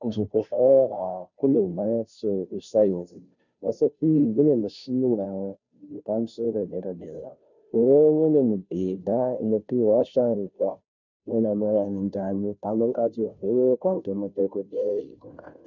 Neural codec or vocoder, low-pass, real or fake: codec, 16 kHz, 0.5 kbps, FunCodec, trained on Chinese and English, 25 frames a second; 7.2 kHz; fake